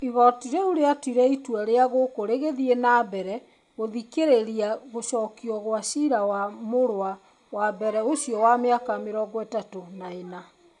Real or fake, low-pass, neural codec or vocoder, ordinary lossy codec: real; 10.8 kHz; none; none